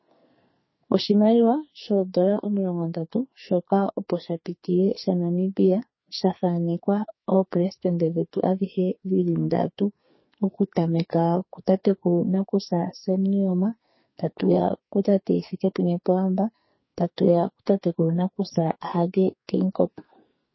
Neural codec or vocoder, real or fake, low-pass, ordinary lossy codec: codec, 44.1 kHz, 2.6 kbps, SNAC; fake; 7.2 kHz; MP3, 24 kbps